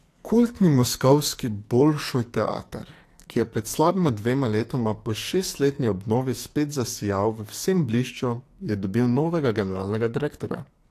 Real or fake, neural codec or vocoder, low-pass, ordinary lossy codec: fake; codec, 44.1 kHz, 2.6 kbps, SNAC; 14.4 kHz; AAC, 64 kbps